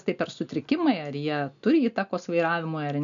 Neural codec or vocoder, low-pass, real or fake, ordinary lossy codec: none; 7.2 kHz; real; MP3, 96 kbps